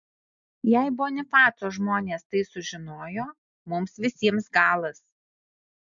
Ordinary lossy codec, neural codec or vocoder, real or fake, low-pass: MP3, 64 kbps; none; real; 7.2 kHz